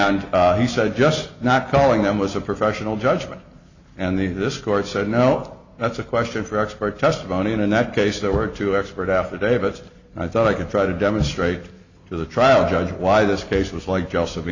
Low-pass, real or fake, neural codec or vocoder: 7.2 kHz; real; none